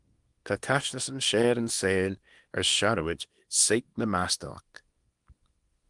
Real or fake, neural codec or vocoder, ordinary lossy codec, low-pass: fake; codec, 24 kHz, 0.9 kbps, WavTokenizer, small release; Opus, 32 kbps; 10.8 kHz